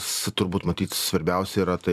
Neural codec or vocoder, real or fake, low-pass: vocoder, 44.1 kHz, 128 mel bands every 256 samples, BigVGAN v2; fake; 14.4 kHz